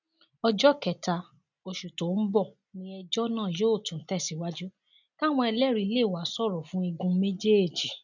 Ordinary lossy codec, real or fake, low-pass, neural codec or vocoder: none; real; 7.2 kHz; none